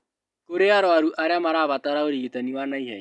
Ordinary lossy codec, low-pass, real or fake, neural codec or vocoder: none; 10.8 kHz; real; none